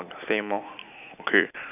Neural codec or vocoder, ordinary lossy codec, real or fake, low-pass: none; none; real; 3.6 kHz